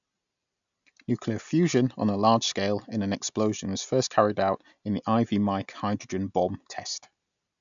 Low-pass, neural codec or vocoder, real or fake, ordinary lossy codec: 7.2 kHz; none; real; none